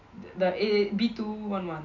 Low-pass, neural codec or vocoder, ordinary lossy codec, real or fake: 7.2 kHz; none; none; real